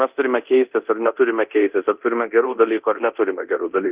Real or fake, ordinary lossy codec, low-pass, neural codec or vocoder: fake; Opus, 32 kbps; 3.6 kHz; codec, 24 kHz, 0.9 kbps, DualCodec